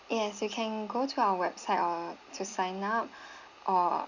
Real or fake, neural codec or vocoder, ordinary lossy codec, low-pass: real; none; none; 7.2 kHz